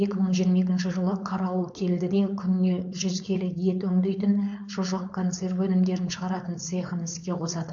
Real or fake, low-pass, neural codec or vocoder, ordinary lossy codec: fake; 7.2 kHz; codec, 16 kHz, 4.8 kbps, FACodec; AAC, 64 kbps